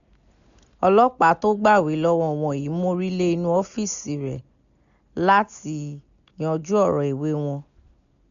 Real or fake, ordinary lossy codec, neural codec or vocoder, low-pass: real; none; none; 7.2 kHz